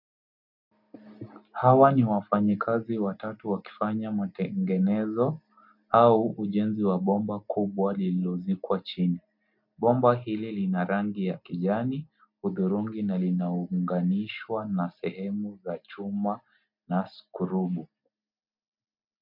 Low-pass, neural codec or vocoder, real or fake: 5.4 kHz; none; real